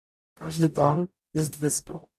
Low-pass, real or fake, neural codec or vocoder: 14.4 kHz; fake; codec, 44.1 kHz, 0.9 kbps, DAC